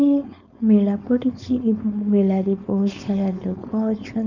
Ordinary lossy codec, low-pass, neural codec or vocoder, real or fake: none; 7.2 kHz; codec, 16 kHz, 4.8 kbps, FACodec; fake